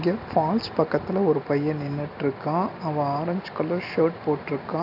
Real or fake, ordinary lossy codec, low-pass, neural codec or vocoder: real; AAC, 48 kbps; 5.4 kHz; none